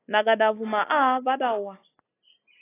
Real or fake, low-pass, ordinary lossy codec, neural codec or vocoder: real; 3.6 kHz; AAC, 16 kbps; none